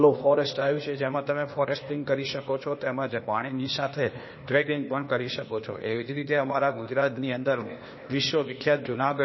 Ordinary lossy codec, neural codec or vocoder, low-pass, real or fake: MP3, 24 kbps; codec, 16 kHz, 0.8 kbps, ZipCodec; 7.2 kHz; fake